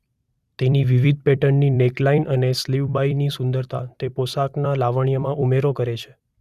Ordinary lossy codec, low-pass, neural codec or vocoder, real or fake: Opus, 64 kbps; 14.4 kHz; vocoder, 44.1 kHz, 128 mel bands every 256 samples, BigVGAN v2; fake